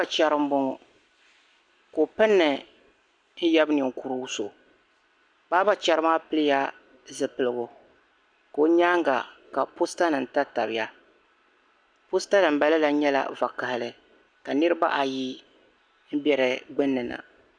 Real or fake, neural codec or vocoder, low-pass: real; none; 9.9 kHz